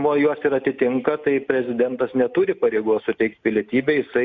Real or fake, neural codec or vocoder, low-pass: real; none; 7.2 kHz